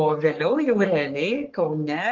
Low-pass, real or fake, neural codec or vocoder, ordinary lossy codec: 7.2 kHz; fake; codec, 44.1 kHz, 3.4 kbps, Pupu-Codec; Opus, 32 kbps